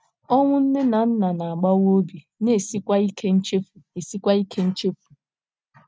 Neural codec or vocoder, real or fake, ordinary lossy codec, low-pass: none; real; none; none